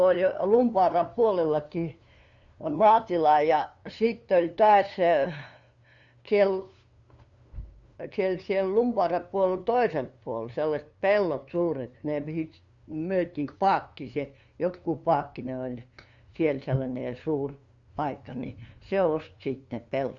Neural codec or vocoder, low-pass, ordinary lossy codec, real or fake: codec, 16 kHz, 2 kbps, FunCodec, trained on LibriTTS, 25 frames a second; 7.2 kHz; Opus, 64 kbps; fake